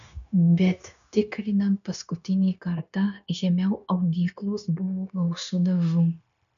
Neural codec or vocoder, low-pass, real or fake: codec, 16 kHz, 0.9 kbps, LongCat-Audio-Codec; 7.2 kHz; fake